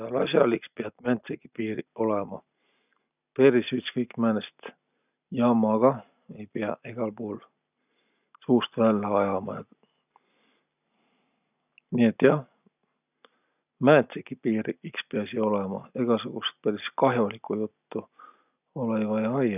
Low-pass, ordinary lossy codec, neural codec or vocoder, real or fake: 3.6 kHz; none; none; real